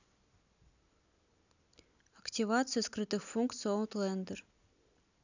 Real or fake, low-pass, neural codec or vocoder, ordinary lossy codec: real; 7.2 kHz; none; none